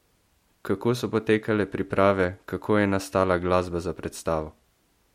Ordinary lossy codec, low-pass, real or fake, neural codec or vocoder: MP3, 64 kbps; 19.8 kHz; real; none